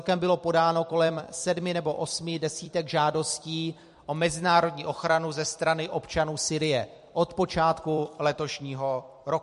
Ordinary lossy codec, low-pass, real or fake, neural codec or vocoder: MP3, 48 kbps; 14.4 kHz; fake; vocoder, 44.1 kHz, 128 mel bands every 256 samples, BigVGAN v2